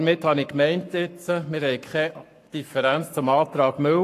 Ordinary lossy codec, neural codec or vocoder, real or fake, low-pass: AAC, 64 kbps; codec, 44.1 kHz, 7.8 kbps, Pupu-Codec; fake; 14.4 kHz